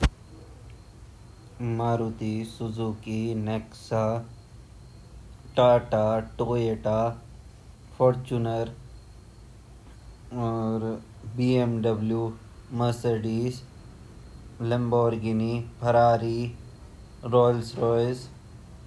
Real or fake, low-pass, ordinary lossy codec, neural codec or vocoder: real; none; none; none